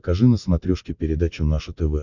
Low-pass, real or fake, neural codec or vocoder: 7.2 kHz; real; none